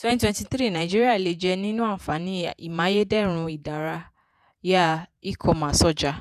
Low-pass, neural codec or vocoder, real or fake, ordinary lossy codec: 14.4 kHz; vocoder, 48 kHz, 128 mel bands, Vocos; fake; none